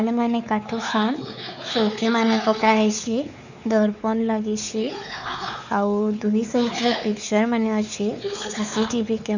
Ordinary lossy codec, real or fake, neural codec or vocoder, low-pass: none; fake; codec, 16 kHz, 4 kbps, X-Codec, WavLM features, trained on Multilingual LibriSpeech; 7.2 kHz